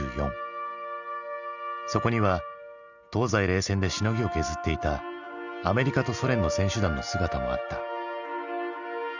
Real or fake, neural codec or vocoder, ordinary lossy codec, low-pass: real; none; Opus, 64 kbps; 7.2 kHz